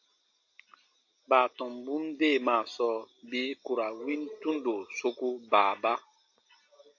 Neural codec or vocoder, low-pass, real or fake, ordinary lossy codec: none; 7.2 kHz; real; AAC, 48 kbps